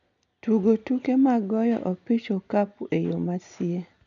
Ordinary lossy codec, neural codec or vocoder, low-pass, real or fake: none; none; 7.2 kHz; real